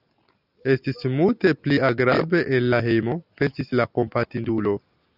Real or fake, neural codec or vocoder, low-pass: real; none; 5.4 kHz